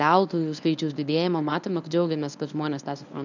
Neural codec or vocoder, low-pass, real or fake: codec, 24 kHz, 0.9 kbps, WavTokenizer, medium speech release version 1; 7.2 kHz; fake